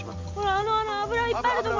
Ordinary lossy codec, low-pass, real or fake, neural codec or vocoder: Opus, 32 kbps; 7.2 kHz; real; none